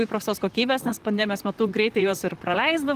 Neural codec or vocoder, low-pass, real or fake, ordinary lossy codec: vocoder, 44.1 kHz, 128 mel bands, Pupu-Vocoder; 14.4 kHz; fake; Opus, 24 kbps